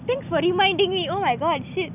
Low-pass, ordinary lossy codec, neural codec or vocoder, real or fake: 3.6 kHz; none; none; real